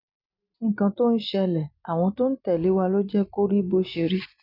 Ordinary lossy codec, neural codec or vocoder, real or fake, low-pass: none; none; real; 5.4 kHz